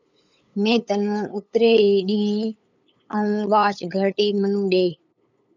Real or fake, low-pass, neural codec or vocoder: fake; 7.2 kHz; codec, 16 kHz, 8 kbps, FunCodec, trained on LibriTTS, 25 frames a second